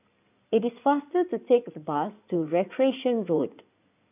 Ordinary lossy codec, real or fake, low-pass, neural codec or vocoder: none; fake; 3.6 kHz; codec, 16 kHz in and 24 kHz out, 2.2 kbps, FireRedTTS-2 codec